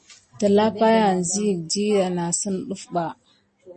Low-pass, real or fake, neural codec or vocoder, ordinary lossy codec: 9.9 kHz; real; none; MP3, 32 kbps